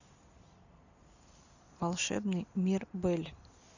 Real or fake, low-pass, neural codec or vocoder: real; 7.2 kHz; none